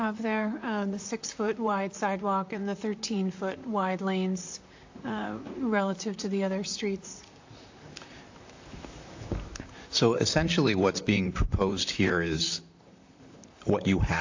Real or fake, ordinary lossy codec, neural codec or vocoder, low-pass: fake; AAC, 48 kbps; vocoder, 44.1 kHz, 128 mel bands, Pupu-Vocoder; 7.2 kHz